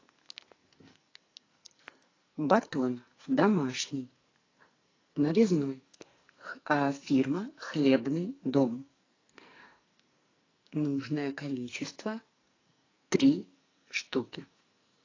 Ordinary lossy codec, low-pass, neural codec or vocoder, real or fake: AAC, 32 kbps; 7.2 kHz; codec, 44.1 kHz, 2.6 kbps, SNAC; fake